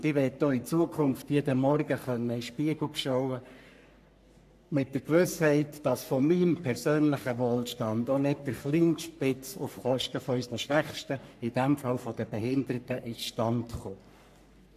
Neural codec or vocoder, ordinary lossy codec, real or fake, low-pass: codec, 44.1 kHz, 3.4 kbps, Pupu-Codec; AAC, 96 kbps; fake; 14.4 kHz